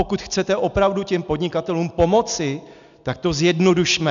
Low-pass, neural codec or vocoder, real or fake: 7.2 kHz; none; real